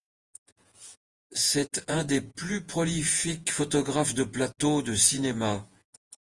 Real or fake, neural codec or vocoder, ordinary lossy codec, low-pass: fake; vocoder, 48 kHz, 128 mel bands, Vocos; Opus, 24 kbps; 10.8 kHz